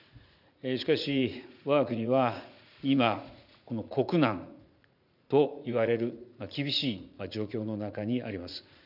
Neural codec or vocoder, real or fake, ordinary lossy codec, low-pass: vocoder, 44.1 kHz, 80 mel bands, Vocos; fake; none; 5.4 kHz